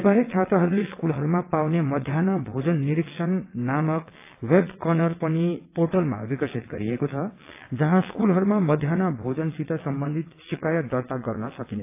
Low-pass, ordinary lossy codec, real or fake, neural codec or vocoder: 3.6 kHz; AAC, 24 kbps; fake; vocoder, 22.05 kHz, 80 mel bands, WaveNeXt